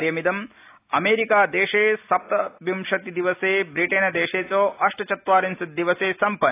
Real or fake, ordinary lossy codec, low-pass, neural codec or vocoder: real; AAC, 24 kbps; 3.6 kHz; none